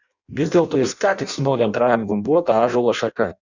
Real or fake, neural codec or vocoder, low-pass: fake; codec, 16 kHz in and 24 kHz out, 0.6 kbps, FireRedTTS-2 codec; 7.2 kHz